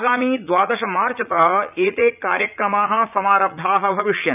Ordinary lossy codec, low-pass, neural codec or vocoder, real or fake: none; 3.6 kHz; vocoder, 44.1 kHz, 80 mel bands, Vocos; fake